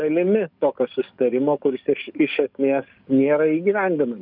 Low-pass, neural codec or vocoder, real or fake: 5.4 kHz; codec, 44.1 kHz, 7.8 kbps, DAC; fake